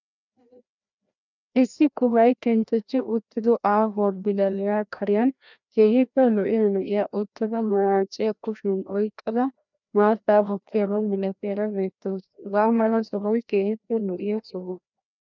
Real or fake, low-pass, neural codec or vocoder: fake; 7.2 kHz; codec, 16 kHz, 1 kbps, FreqCodec, larger model